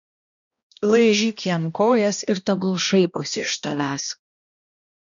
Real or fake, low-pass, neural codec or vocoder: fake; 7.2 kHz; codec, 16 kHz, 1 kbps, X-Codec, HuBERT features, trained on balanced general audio